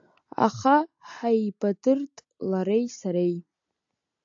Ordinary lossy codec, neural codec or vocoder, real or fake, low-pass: AAC, 64 kbps; none; real; 7.2 kHz